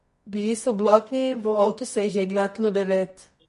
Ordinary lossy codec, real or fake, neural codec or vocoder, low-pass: MP3, 48 kbps; fake; codec, 24 kHz, 0.9 kbps, WavTokenizer, medium music audio release; 10.8 kHz